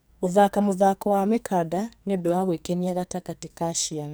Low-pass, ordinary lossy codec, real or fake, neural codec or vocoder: none; none; fake; codec, 44.1 kHz, 2.6 kbps, SNAC